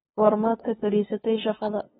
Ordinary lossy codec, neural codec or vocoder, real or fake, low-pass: AAC, 16 kbps; codec, 16 kHz, 2 kbps, FunCodec, trained on LibriTTS, 25 frames a second; fake; 7.2 kHz